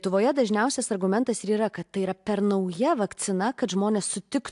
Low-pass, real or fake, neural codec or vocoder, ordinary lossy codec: 10.8 kHz; real; none; AAC, 64 kbps